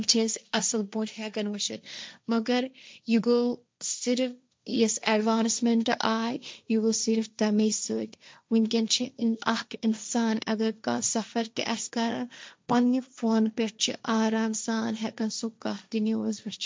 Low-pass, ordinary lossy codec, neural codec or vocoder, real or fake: none; none; codec, 16 kHz, 1.1 kbps, Voila-Tokenizer; fake